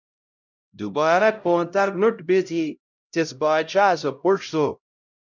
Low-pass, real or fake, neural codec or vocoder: 7.2 kHz; fake; codec, 16 kHz, 0.5 kbps, X-Codec, HuBERT features, trained on LibriSpeech